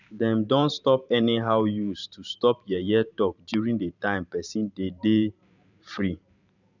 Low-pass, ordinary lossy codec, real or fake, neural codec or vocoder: 7.2 kHz; none; fake; vocoder, 44.1 kHz, 128 mel bands every 512 samples, BigVGAN v2